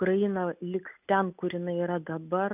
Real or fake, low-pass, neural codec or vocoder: real; 3.6 kHz; none